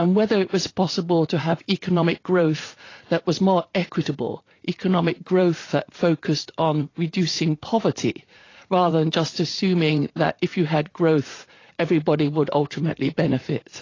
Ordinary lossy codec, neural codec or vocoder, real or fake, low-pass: AAC, 32 kbps; vocoder, 44.1 kHz, 80 mel bands, Vocos; fake; 7.2 kHz